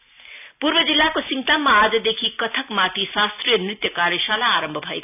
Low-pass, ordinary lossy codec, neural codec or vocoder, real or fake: 3.6 kHz; none; none; real